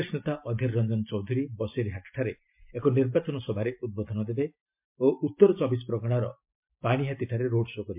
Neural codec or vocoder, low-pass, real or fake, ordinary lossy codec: none; 3.6 kHz; real; MP3, 32 kbps